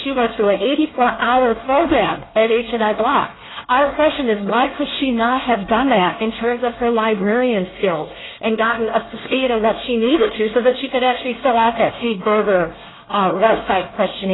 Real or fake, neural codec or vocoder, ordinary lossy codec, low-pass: fake; codec, 24 kHz, 1 kbps, SNAC; AAC, 16 kbps; 7.2 kHz